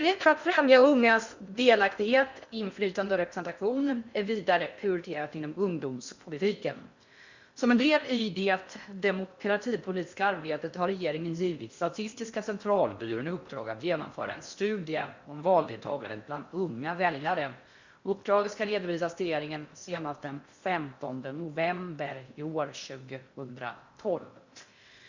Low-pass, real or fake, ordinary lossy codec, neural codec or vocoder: 7.2 kHz; fake; Opus, 64 kbps; codec, 16 kHz in and 24 kHz out, 0.6 kbps, FocalCodec, streaming, 2048 codes